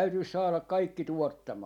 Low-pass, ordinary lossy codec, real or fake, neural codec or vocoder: 19.8 kHz; none; real; none